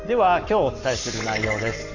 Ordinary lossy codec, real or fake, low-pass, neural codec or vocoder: none; fake; 7.2 kHz; codec, 44.1 kHz, 7.8 kbps, Pupu-Codec